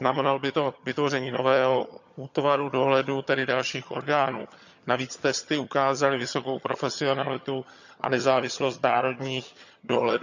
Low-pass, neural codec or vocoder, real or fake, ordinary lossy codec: 7.2 kHz; vocoder, 22.05 kHz, 80 mel bands, HiFi-GAN; fake; none